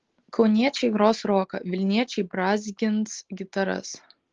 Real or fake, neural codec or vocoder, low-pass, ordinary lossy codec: real; none; 7.2 kHz; Opus, 16 kbps